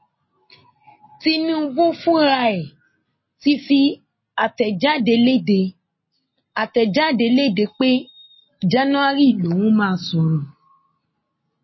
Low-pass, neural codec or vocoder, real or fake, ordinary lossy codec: 7.2 kHz; none; real; MP3, 24 kbps